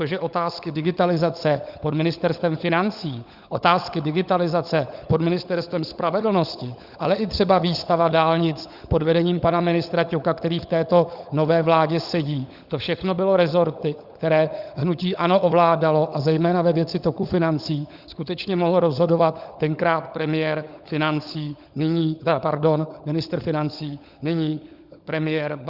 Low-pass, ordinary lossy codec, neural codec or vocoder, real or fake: 5.4 kHz; Opus, 64 kbps; codec, 16 kHz, 16 kbps, FunCodec, trained on LibriTTS, 50 frames a second; fake